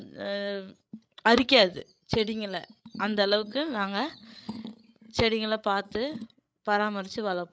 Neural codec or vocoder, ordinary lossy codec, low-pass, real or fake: codec, 16 kHz, 16 kbps, FreqCodec, larger model; none; none; fake